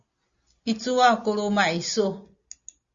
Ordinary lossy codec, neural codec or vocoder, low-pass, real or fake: Opus, 64 kbps; none; 7.2 kHz; real